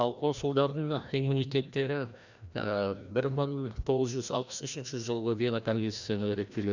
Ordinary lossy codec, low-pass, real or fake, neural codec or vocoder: none; 7.2 kHz; fake; codec, 16 kHz, 1 kbps, FreqCodec, larger model